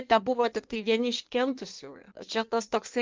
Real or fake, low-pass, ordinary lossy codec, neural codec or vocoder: fake; 7.2 kHz; Opus, 24 kbps; codec, 16 kHz in and 24 kHz out, 1.1 kbps, FireRedTTS-2 codec